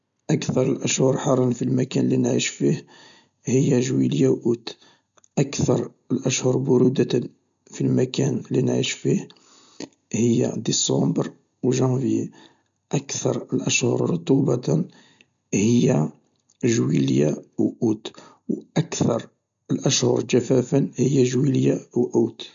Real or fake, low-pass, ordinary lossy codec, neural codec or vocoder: real; 7.2 kHz; MP3, 64 kbps; none